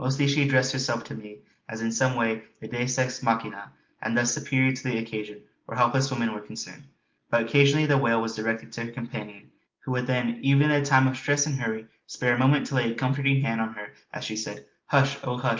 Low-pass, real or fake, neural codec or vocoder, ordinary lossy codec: 7.2 kHz; real; none; Opus, 24 kbps